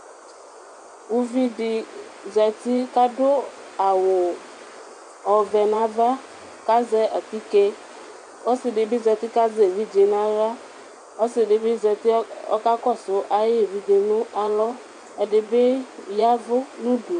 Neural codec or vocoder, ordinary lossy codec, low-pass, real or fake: vocoder, 24 kHz, 100 mel bands, Vocos; MP3, 96 kbps; 10.8 kHz; fake